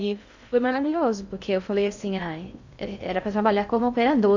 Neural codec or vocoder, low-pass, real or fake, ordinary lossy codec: codec, 16 kHz in and 24 kHz out, 0.6 kbps, FocalCodec, streaming, 2048 codes; 7.2 kHz; fake; none